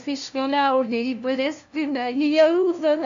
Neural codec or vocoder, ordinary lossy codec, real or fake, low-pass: codec, 16 kHz, 0.5 kbps, FunCodec, trained on LibriTTS, 25 frames a second; AAC, 64 kbps; fake; 7.2 kHz